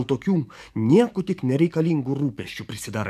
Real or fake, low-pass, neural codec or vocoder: fake; 14.4 kHz; codec, 44.1 kHz, 7.8 kbps, DAC